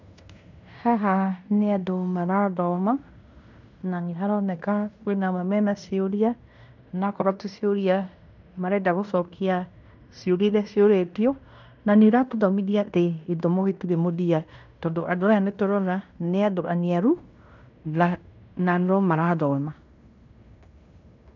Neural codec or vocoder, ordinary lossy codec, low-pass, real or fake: codec, 16 kHz in and 24 kHz out, 0.9 kbps, LongCat-Audio-Codec, fine tuned four codebook decoder; none; 7.2 kHz; fake